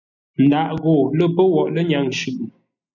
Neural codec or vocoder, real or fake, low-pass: none; real; 7.2 kHz